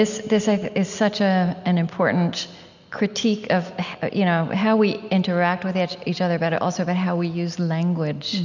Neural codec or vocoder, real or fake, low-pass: none; real; 7.2 kHz